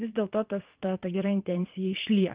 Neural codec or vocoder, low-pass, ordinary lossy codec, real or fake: vocoder, 22.05 kHz, 80 mel bands, Vocos; 3.6 kHz; Opus, 24 kbps; fake